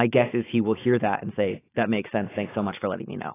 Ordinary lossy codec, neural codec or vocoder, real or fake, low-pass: AAC, 16 kbps; autoencoder, 48 kHz, 128 numbers a frame, DAC-VAE, trained on Japanese speech; fake; 3.6 kHz